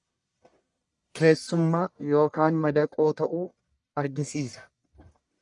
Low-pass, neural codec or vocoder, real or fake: 10.8 kHz; codec, 44.1 kHz, 1.7 kbps, Pupu-Codec; fake